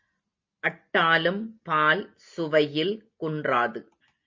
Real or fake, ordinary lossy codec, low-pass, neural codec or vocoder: real; MP3, 48 kbps; 7.2 kHz; none